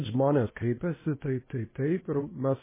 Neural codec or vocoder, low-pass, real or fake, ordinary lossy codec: codec, 16 kHz, 0.8 kbps, ZipCodec; 3.6 kHz; fake; MP3, 16 kbps